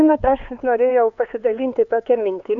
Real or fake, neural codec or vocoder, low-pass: fake; codec, 16 kHz, 4 kbps, X-Codec, HuBERT features, trained on LibriSpeech; 7.2 kHz